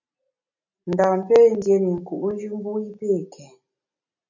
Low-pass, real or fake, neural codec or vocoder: 7.2 kHz; real; none